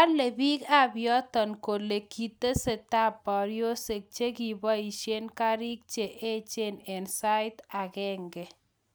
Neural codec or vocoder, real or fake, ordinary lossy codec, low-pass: none; real; none; none